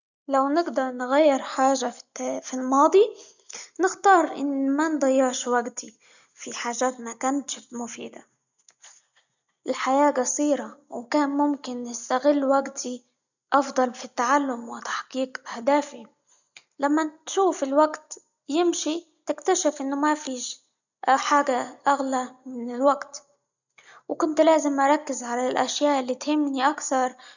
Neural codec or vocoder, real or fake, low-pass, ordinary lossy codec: none; real; 7.2 kHz; none